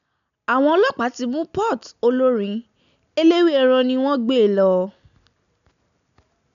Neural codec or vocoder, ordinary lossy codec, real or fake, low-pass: none; none; real; 7.2 kHz